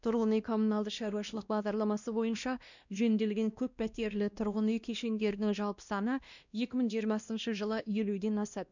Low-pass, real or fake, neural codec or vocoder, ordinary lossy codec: 7.2 kHz; fake; codec, 16 kHz, 1 kbps, X-Codec, WavLM features, trained on Multilingual LibriSpeech; none